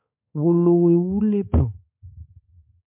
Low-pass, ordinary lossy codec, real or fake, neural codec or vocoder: 3.6 kHz; MP3, 32 kbps; fake; codec, 16 kHz, 4 kbps, X-Codec, HuBERT features, trained on balanced general audio